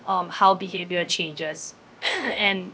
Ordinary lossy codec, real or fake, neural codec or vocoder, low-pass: none; fake; codec, 16 kHz, 0.7 kbps, FocalCodec; none